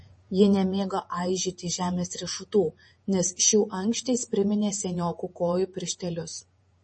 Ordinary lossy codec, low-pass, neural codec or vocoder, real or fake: MP3, 32 kbps; 10.8 kHz; vocoder, 48 kHz, 128 mel bands, Vocos; fake